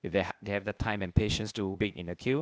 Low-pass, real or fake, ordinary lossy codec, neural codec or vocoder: none; fake; none; codec, 16 kHz, 0.8 kbps, ZipCodec